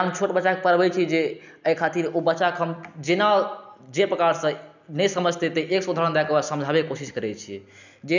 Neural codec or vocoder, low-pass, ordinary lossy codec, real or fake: none; 7.2 kHz; none; real